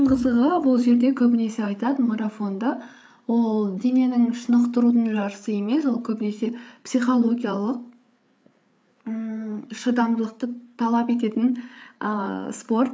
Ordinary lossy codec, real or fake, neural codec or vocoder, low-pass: none; fake; codec, 16 kHz, 8 kbps, FreqCodec, larger model; none